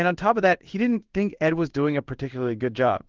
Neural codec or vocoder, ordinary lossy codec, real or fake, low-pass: codec, 16 kHz in and 24 kHz out, 1 kbps, XY-Tokenizer; Opus, 16 kbps; fake; 7.2 kHz